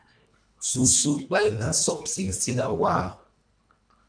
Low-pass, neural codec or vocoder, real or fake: 9.9 kHz; codec, 24 kHz, 1.5 kbps, HILCodec; fake